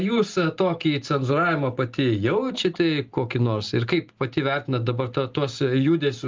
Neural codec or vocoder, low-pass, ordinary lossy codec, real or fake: none; 7.2 kHz; Opus, 32 kbps; real